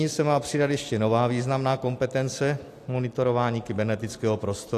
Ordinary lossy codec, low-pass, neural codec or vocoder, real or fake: AAC, 64 kbps; 14.4 kHz; none; real